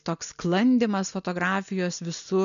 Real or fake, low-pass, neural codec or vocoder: real; 7.2 kHz; none